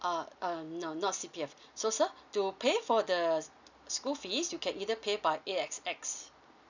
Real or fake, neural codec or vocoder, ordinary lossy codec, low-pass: real; none; none; 7.2 kHz